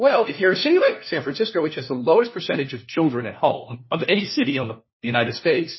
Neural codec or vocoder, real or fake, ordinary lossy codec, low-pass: codec, 16 kHz, 1 kbps, FunCodec, trained on LibriTTS, 50 frames a second; fake; MP3, 24 kbps; 7.2 kHz